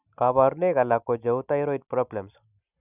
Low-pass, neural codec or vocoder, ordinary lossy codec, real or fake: 3.6 kHz; none; none; real